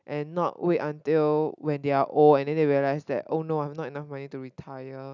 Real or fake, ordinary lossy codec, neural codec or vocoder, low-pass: real; none; none; 7.2 kHz